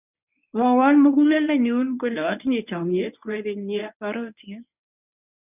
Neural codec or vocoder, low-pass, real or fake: codec, 24 kHz, 0.9 kbps, WavTokenizer, medium speech release version 1; 3.6 kHz; fake